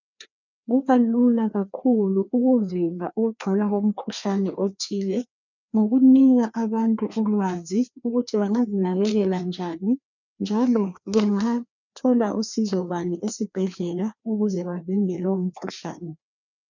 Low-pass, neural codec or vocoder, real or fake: 7.2 kHz; codec, 16 kHz, 2 kbps, FreqCodec, larger model; fake